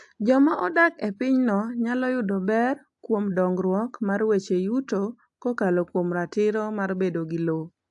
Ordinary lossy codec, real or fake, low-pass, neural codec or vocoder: AAC, 64 kbps; real; 10.8 kHz; none